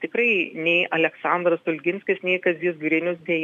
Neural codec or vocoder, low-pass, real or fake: none; 14.4 kHz; real